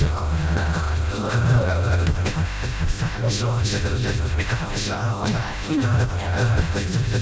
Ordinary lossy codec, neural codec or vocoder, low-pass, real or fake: none; codec, 16 kHz, 0.5 kbps, FreqCodec, smaller model; none; fake